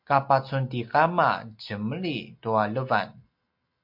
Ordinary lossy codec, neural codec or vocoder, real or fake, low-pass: MP3, 48 kbps; none; real; 5.4 kHz